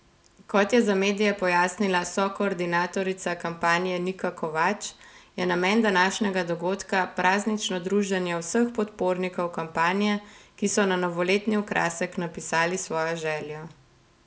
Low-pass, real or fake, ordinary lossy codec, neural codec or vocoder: none; real; none; none